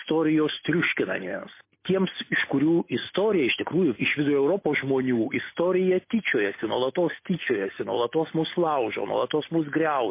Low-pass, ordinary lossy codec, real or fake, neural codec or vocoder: 3.6 kHz; MP3, 24 kbps; real; none